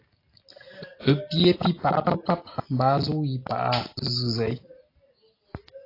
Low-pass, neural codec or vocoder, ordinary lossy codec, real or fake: 5.4 kHz; none; AAC, 32 kbps; real